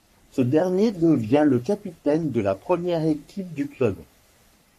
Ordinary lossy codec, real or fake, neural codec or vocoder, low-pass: MP3, 64 kbps; fake; codec, 44.1 kHz, 3.4 kbps, Pupu-Codec; 14.4 kHz